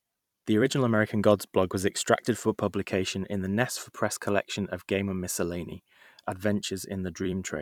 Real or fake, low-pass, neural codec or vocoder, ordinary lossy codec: fake; 19.8 kHz; vocoder, 44.1 kHz, 128 mel bands every 256 samples, BigVGAN v2; none